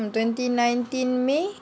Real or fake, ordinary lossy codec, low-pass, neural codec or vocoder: real; none; none; none